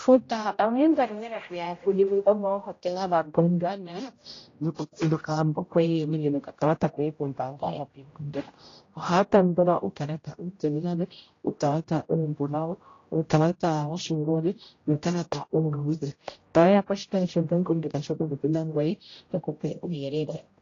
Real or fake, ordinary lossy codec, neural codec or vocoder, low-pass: fake; AAC, 32 kbps; codec, 16 kHz, 0.5 kbps, X-Codec, HuBERT features, trained on general audio; 7.2 kHz